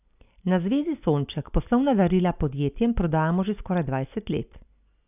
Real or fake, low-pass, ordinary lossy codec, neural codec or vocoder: real; 3.6 kHz; none; none